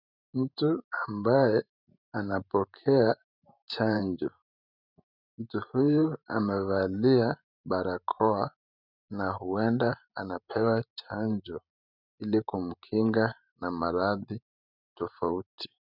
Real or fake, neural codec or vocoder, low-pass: fake; vocoder, 44.1 kHz, 128 mel bands every 512 samples, BigVGAN v2; 5.4 kHz